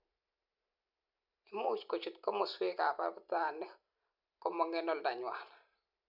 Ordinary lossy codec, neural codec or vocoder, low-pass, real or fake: none; none; 5.4 kHz; real